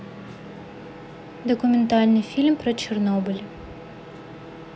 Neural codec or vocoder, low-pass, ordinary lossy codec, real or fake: none; none; none; real